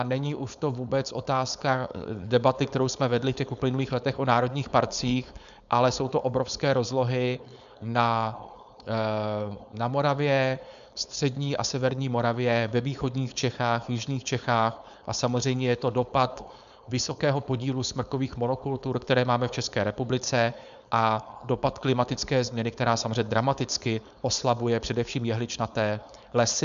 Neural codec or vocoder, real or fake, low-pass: codec, 16 kHz, 4.8 kbps, FACodec; fake; 7.2 kHz